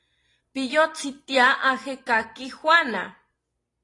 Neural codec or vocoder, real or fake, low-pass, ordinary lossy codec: none; real; 10.8 kHz; AAC, 32 kbps